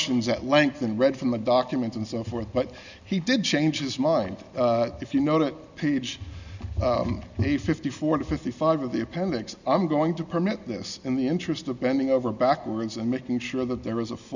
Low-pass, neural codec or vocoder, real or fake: 7.2 kHz; none; real